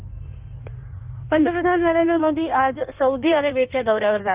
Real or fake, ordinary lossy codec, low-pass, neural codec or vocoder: fake; Opus, 32 kbps; 3.6 kHz; codec, 16 kHz in and 24 kHz out, 1.1 kbps, FireRedTTS-2 codec